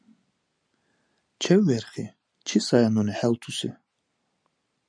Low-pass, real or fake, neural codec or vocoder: 9.9 kHz; real; none